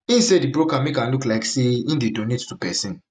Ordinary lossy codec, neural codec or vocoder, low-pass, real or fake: none; none; none; real